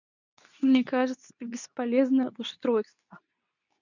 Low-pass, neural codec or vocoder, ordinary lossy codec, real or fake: 7.2 kHz; codec, 24 kHz, 0.9 kbps, WavTokenizer, medium speech release version 2; none; fake